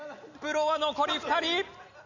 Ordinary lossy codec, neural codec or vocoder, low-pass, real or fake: none; none; 7.2 kHz; real